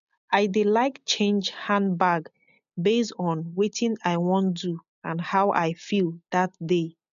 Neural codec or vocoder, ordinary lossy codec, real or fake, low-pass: none; MP3, 96 kbps; real; 7.2 kHz